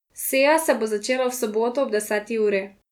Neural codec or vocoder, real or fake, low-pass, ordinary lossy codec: none; real; 19.8 kHz; none